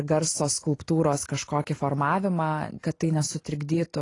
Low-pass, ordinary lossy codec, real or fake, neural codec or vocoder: 10.8 kHz; AAC, 32 kbps; real; none